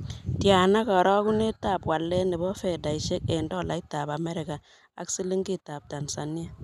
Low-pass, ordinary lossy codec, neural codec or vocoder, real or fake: 14.4 kHz; none; none; real